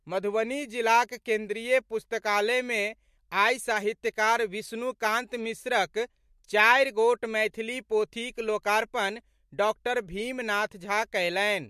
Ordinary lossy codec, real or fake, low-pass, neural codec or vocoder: MP3, 64 kbps; real; 14.4 kHz; none